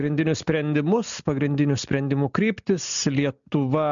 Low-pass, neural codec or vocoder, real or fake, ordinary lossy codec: 7.2 kHz; none; real; MP3, 96 kbps